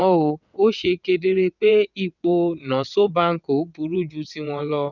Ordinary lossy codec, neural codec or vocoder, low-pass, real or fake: none; vocoder, 22.05 kHz, 80 mel bands, WaveNeXt; 7.2 kHz; fake